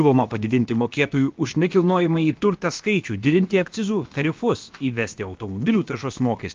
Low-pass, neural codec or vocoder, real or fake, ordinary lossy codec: 7.2 kHz; codec, 16 kHz, about 1 kbps, DyCAST, with the encoder's durations; fake; Opus, 24 kbps